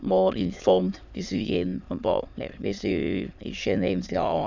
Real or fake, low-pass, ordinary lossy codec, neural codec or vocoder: fake; 7.2 kHz; none; autoencoder, 22.05 kHz, a latent of 192 numbers a frame, VITS, trained on many speakers